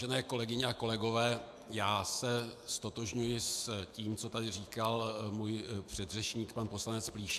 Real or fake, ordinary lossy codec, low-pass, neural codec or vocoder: fake; AAC, 96 kbps; 14.4 kHz; autoencoder, 48 kHz, 128 numbers a frame, DAC-VAE, trained on Japanese speech